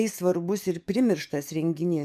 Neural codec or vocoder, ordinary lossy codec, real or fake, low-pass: codec, 44.1 kHz, 7.8 kbps, DAC; MP3, 96 kbps; fake; 14.4 kHz